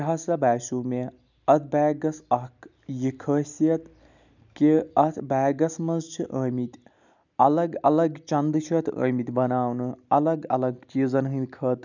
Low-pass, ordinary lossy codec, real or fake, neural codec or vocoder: 7.2 kHz; none; real; none